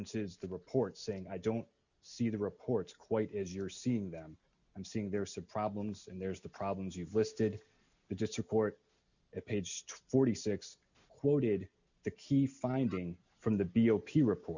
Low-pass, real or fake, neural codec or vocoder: 7.2 kHz; real; none